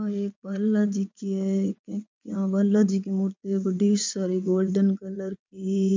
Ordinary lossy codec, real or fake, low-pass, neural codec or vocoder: MP3, 64 kbps; fake; 7.2 kHz; vocoder, 22.05 kHz, 80 mel bands, WaveNeXt